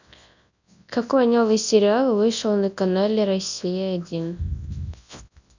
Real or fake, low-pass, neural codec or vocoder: fake; 7.2 kHz; codec, 24 kHz, 0.9 kbps, WavTokenizer, large speech release